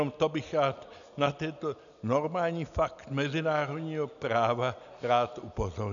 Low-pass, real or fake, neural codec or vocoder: 7.2 kHz; real; none